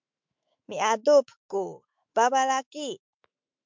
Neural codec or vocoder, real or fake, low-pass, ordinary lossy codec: autoencoder, 48 kHz, 128 numbers a frame, DAC-VAE, trained on Japanese speech; fake; 7.2 kHz; MP3, 64 kbps